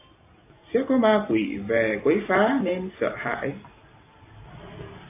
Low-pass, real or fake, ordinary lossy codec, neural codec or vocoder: 3.6 kHz; real; AAC, 24 kbps; none